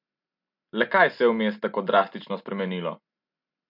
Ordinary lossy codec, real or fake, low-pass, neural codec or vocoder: MP3, 48 kbps; real; 5.4 kHz; none